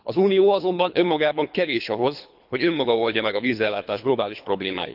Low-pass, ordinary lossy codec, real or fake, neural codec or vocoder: 5.4 kHz; none; fake; codec, 24 kHz, 3 kbps, HILCodec